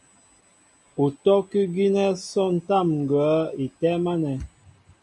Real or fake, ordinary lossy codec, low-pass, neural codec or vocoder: real; AAC, 64 kbps; 10.8 kHz; none